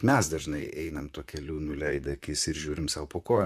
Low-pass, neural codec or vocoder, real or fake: 14.4 kHz; vocoder, 44.1 kHz, 128 mel bands, Pupu-Vocoder; fake